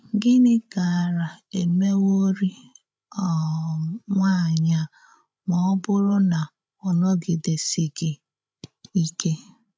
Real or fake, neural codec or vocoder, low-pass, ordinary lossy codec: fake; codec, 16 kHz, 16 kbps, FreqCodec, larger model; none; none